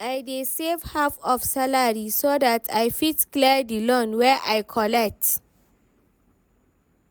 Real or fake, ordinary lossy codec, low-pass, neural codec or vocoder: real; none; none; none